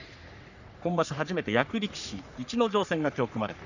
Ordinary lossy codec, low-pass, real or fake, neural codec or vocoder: none; 7.2 kHz; fake; codec, 44.1 kHz, 3.4 kbps, Pupu-Codec